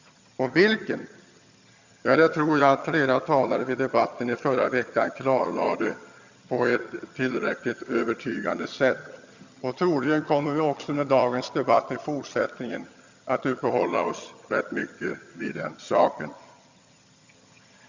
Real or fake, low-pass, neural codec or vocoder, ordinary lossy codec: fake; 7.2 kHz; vocoder, 22.05 kHz, 80 mel bands, HiFi-GAN; Opus, 64 kbps